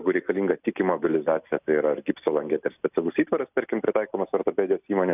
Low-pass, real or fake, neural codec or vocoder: 3.6 kHz; real; none